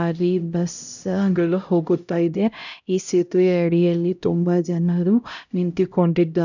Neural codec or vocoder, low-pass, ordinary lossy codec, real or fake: codec, 16 kHz, 0.5 kbps, X-Codec, HuBERT features, trained on LibriSpeech; 7.2 kHz; none; fake